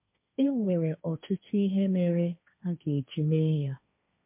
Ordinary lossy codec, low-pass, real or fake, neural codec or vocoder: MP3, 32 kbps; 3.6 kHz; fake; codec, 16 kHz, 1.1 kbps, Voila-Tokenizer